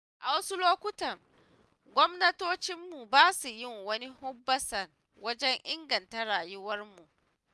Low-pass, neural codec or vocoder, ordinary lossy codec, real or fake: none; none; none; real